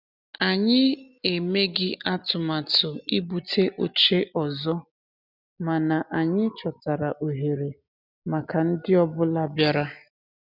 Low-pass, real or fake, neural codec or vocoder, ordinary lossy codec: 5.4 kHz; real; none; none